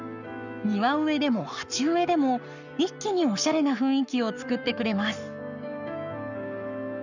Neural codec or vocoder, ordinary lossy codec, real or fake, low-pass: codec, 44.1 kHz, 7.8 kbps, Pupu-Codec; none; fake; 7.2 kHz